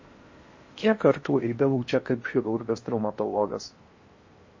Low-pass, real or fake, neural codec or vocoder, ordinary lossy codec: 7.2 kHz; fake; codec, 16 kHz in and 24 kHz out, 0.8 kbps, FocalCodec, streaming, 65536 codes; MP3, 32 kbps